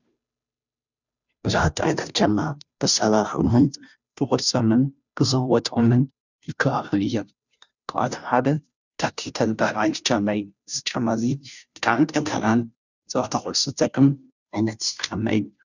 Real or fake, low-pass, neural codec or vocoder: fake; 7.2 kHz; codec, 16 kHz, 0.5 kbps, FunCodec, trained on Chinese and English, 25 frames a second